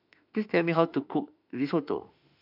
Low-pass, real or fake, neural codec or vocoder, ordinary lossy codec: 5.4 kHz; fake; autoencoder, 48 kHz, 32 numbers a frame, DAC-VAE, trained on Japanese speech; AAC, 48 kbps